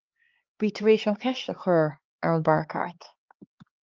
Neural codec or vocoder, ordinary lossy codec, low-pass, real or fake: codec, 16 kHz, 2 kbps, X-Codec, HuBERT features, trained on LibriSpeech; Opus, 32 kbps; 7.2 kHz; fake